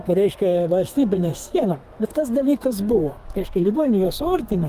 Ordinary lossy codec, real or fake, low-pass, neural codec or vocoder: Opus, 32 kbps; fake; 14.4 kHz; codec, 32 kHz, 1.9 kbps, SNAC